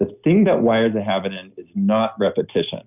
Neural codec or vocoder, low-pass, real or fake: none; 3.6 kHz; real